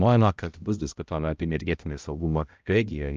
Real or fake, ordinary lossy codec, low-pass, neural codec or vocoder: fake; Opus, 24 kbps; 7.2 kHz; codec, 16 kHz, 0.5 kbps, X-Codec, HuBERT features, trained on balanced general audio